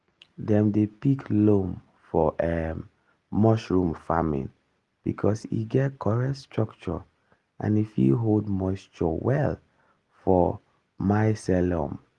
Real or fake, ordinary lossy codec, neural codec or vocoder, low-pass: real; Opus, 24 kbps; none; 10.8 kHz